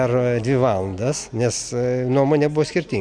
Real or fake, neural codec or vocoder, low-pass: real; none; 9.9 kHz